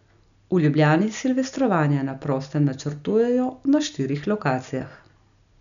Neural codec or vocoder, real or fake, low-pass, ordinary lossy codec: none; real; 7.2 kHz; none